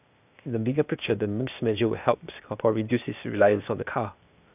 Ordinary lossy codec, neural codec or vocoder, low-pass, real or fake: none; codec, 16 kHz, 0.8 kbps, ZipCodec; 3.6 kHz; fake